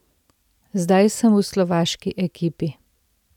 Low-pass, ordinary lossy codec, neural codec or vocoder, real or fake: 19.8 kHz; none; none; real